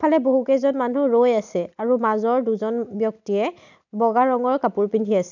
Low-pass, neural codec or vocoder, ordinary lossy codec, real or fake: 7.2 kHz; none; none; real